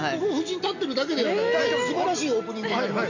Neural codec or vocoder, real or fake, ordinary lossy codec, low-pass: none; real; none; 7.2 kHz